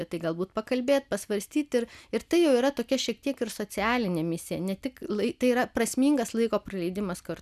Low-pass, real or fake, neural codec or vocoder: 14.4 kHz; real; none